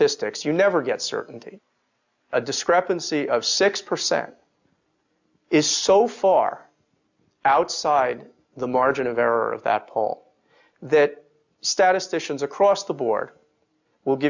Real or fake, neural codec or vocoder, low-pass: real; none; 7.2 kHz